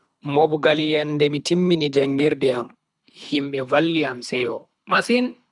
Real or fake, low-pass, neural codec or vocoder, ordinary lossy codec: fake; 10.8 kHz; codec, 24 kHz, 3 kbps, HILCodec; none